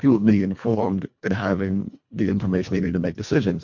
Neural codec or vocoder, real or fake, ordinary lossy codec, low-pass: codec, 24 kHz, 1.5 kbps, HILCodec; fake; MP3, 48 kbps; 7.2 kHz